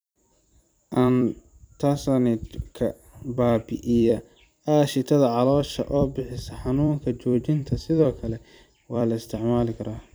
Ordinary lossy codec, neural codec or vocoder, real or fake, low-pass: none; vocoder, 44.1 kHz, 128 mel bands every 256 samples, BigVGAN v2; fake; none